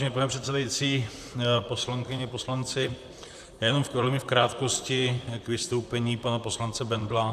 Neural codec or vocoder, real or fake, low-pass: vocoder, 44.1 kHz, 128 mel bands, Pupu-Vocoder; fake; 14.4 kHz